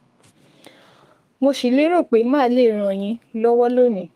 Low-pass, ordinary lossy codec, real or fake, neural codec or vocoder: 14.4 kHz; Opus, 32 kbps; fake; codec, 32 kHz, 1.9 kbps, SNAC